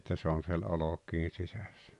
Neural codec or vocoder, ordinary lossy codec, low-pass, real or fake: none; none; 10.8 kHz; real